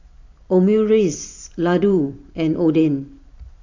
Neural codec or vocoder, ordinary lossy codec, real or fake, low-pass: vocoder, 44.1 kHz, 128 mel bands every 512 samples, BigVGAN v2; AAC, 48 kbps; fake; 7.2 kHz